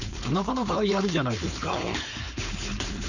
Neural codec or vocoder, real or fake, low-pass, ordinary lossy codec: codec, 16 kHz, 4.8 kbps, FACodec; fake; 7.2 kHz; none